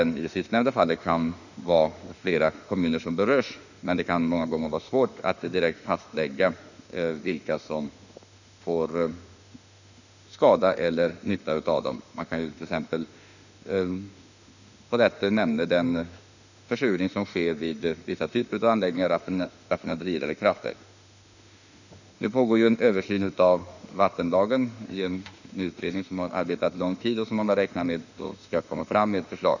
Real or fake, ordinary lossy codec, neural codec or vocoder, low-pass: fake; none; autoencoder, 48 kHz, 32 numbers a frame, DAC-VAE, trained on Japanese speech; 7.2 kHz